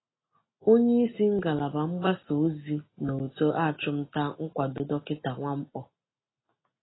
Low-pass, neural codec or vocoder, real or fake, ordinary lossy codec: 7.2 kHz; none; real; AAC, 16 kbps